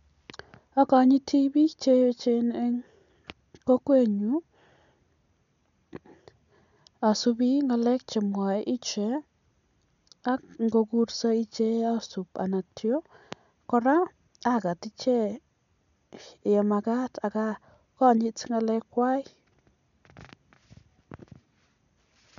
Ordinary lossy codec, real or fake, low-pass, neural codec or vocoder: none; real; 7.2 kHz; none